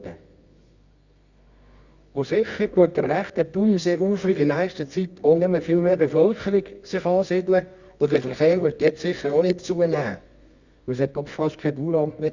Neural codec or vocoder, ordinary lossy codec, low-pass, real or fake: codec, 24 kHz, 0.9 kbps, WavTokenizer, medium music audio release; Opus, 64 kbps; 7.2 kHz; fake